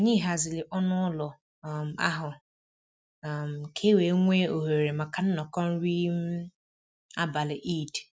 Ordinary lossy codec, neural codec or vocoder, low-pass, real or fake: none; none; none; real